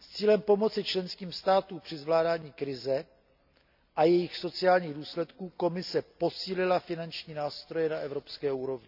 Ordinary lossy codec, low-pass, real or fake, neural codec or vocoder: none; 5.4 kHz; real; none